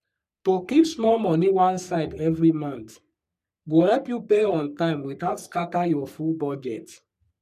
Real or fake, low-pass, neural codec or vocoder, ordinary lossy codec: fake; 14.4 kHz; codec, 44.1 kHz, 3.4 kbps, Pupu-Codec; none